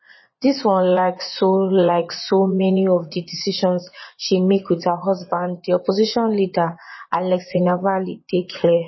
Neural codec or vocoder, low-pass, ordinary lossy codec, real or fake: vocoder, 24 kHz, 100 mel bands, Vocos; 7.2 kHz; MP3, 24 kbps; fake